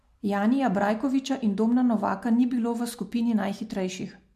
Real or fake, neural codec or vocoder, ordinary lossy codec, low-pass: real; none; MP3, 64 kbps; 14.4 kHz